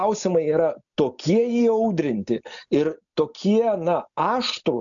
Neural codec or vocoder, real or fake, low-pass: none; real; 7.2 kHz